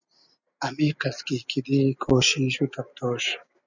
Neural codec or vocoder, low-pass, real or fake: none; 7.2 kHz; real